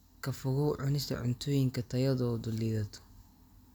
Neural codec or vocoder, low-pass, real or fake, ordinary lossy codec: none; none; real; none